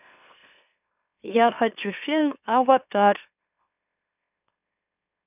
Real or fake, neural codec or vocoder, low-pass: fake; autoencoder, 44.1 kHz, a latent of 192 numbers a frame, MeloTTS; 3.6 kHz